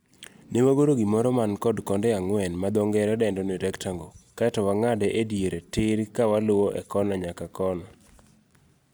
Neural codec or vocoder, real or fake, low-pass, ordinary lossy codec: none; real; none; none